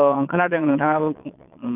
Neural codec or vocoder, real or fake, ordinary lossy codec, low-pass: vocoder, 22.05 kHz, 80 mel bands, Vocos; fake; none; 3.6 kHz